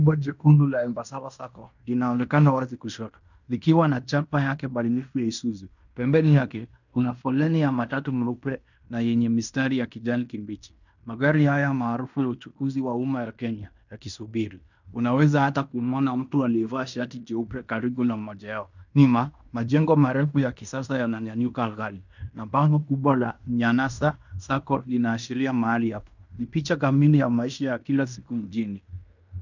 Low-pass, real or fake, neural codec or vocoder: 7.2 kHz; fake; codec, 16 kHz in and 24 kHz out, 0.9 kbps, LongCat-Audio-Codec, fine tuned four codebook decoder